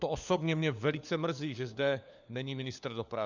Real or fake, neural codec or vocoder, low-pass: fake; codec, 16 kHz, 4 kbps, FunCodec, trained on LibriTTS, 50 frames a second; 7.2 kHz